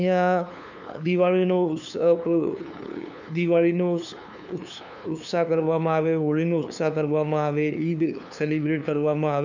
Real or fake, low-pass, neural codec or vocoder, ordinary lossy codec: fake; 7.2 kHz; codec, 16 kHz, 2 kbps, FunCodec, trained on LibriTTS, 25 frames a second; none